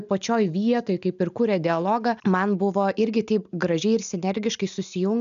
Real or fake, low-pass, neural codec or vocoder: real; 7.2 kHz; none